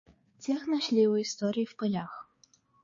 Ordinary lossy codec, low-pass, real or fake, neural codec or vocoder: MP3, 32 kbps; 7.2 kHz; fake; codec, 16 kHz, 4 kbps, X-Codec, HuBERT features, trained on balanced general audio